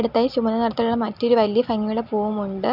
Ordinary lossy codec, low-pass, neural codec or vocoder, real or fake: none; 5.4 kHz; none; real